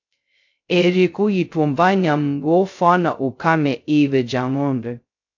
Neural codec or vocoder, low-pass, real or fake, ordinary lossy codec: codec, 16 kHz, 0.2 kbps, FocalCodec; 7.2 kHz; fake; AAC, 48 kbps